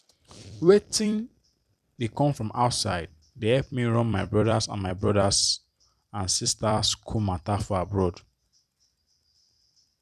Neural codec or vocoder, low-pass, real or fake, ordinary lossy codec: vocoder, 44.1 kHz, 128 mel bands every 256 samples, BigVGAN v2; 14.4 kHz; fake; none